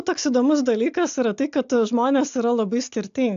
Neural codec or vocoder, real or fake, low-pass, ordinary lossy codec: none; real; 7.2 kHz; AAC, 64 kbps